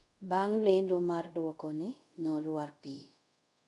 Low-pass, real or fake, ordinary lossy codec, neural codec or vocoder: 10.8 kHz; fake; none; codec, 24 kHz, 0.5 kbps, DualCodec